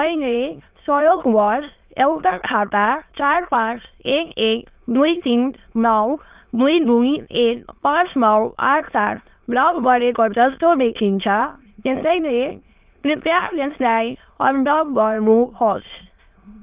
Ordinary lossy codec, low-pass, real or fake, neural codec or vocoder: Opus, 24 kbps; 3.6 kHz; fake; autoencoder, 22.05 kHz, a latent of 192 numbers a frame, VITS, trained on many speakers